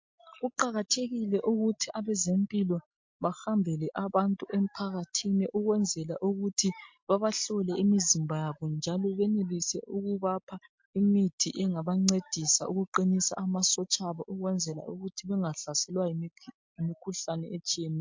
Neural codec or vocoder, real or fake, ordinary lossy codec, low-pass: none; real; MP3, 48 kbps; 7.2 kHz